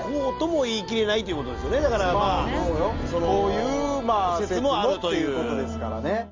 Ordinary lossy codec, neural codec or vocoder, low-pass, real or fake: Opus, 32 kbps; none; 7.2 kHz; real